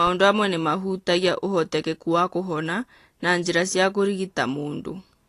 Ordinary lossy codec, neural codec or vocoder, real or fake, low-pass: AAC, 48 kbps; none; real; 14.4 kHz